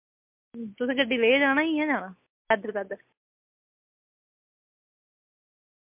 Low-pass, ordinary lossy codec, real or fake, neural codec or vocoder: 3.6 kHz; MP3, 32 kbps; real; none